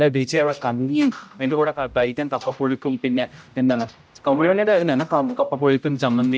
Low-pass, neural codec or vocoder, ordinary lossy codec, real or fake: none; codec, 16 kHz, 0.5 kbps, X-Codec, HuBERT features, trained on general audio; none; fake